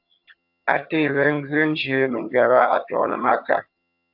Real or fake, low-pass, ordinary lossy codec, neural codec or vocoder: fake; 5.4 kHz; AAC, 48 kbps; vocoder, 22.05 kHz, 80 mel bands, HiFi-GAN